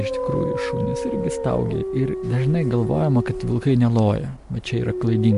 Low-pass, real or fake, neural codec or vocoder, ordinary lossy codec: 10.8 kHz; real; none; MP3, 64 kbps